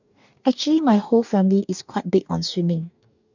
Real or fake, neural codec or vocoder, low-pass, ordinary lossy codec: fake; codec, 44.1 kHz, 2.6 kbps, DAC; 7.2 kHz; none